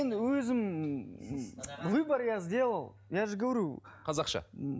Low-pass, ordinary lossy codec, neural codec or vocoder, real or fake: none; none; none; real